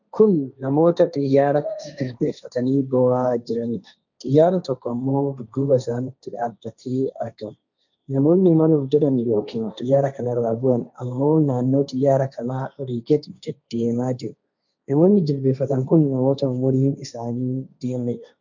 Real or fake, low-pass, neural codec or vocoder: fake; 7.2 kHz; codec, 16 kHz, 1.1 kbps, Voila-Tokenizer